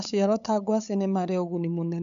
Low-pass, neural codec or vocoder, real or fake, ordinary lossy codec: 7.2 kHz; codec, 16 kHz, 8 kbps, FunCodec, trained on LibriTTS, 25 frames a second; fake; none